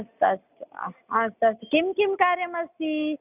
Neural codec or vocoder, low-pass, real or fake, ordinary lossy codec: none; 3.6 kHz; real; none